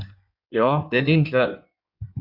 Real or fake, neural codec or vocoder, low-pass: fake; codec, 16 kHz in and 24 kHz out, 1.1 kbps, FireRedTTS-2 codec; 5.4 kHz